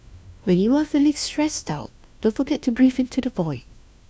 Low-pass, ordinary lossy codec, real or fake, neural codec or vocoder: none; none; fake; codec, 16 kHz, 1 kbps, FunCodec, trained on LibriTTS, 50 frames a second